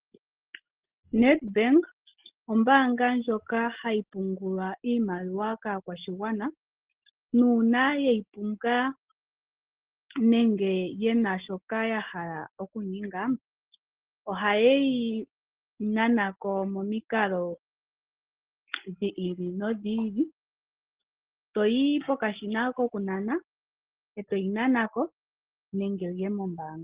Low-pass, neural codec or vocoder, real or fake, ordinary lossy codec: 3.6 kHz; none; real; Opus, 16 kbps